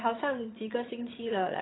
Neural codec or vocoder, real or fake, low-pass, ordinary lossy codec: vocoder, 22.05 kHz, 80 mel bands, HiFi-GAN; fake; 7.2 kHz; AAC, 16 kbps